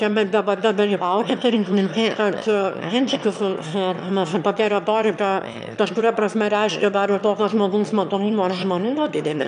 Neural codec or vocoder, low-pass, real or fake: autoencoder, 22.05 kHz, a latent of 192 numbers a frame, VITS, trained on one speaker; 9.9 kHz; fake